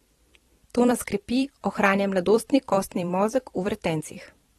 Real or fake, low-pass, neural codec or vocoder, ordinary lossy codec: fake; 19.8 kHz; vocoder, 44.1 kHz, 128 mel bands every 256 samples, BigVGAN v2; AAC, 32 kbps